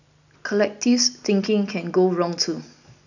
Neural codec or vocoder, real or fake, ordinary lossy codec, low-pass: none; real; none; 7.2 kHz